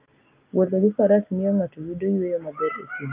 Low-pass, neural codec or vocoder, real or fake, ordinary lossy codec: 3.6 kHz; none; real; Opus, 24 kbps